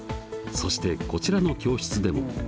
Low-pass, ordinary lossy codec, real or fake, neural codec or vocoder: none; none; real; none